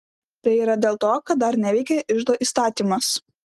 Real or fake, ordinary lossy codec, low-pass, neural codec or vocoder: real; Opus, 24 kbps; 14.4 kHz; none